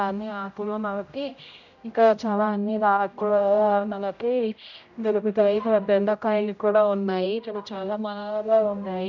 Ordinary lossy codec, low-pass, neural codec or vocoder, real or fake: none; 7.2 kHz; codec, 16 kHz, 0.5 kbps, X-Codec, HuBERT features, trained on general audio; fake